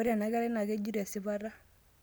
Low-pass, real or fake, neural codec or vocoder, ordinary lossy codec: none; real; none; none